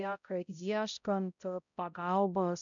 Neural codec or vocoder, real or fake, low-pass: codec, 16 kHz, 0.5 kbps, X-Codec, HuBERT features, trained on balanced general audio; fake; 7.2 kHz